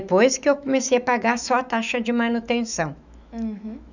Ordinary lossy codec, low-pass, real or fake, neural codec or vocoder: none; 7.2 kHz; fake; autoencoder, 48 kHz, 128 numbers a frame, DAC-VAE, trained on Japanese speech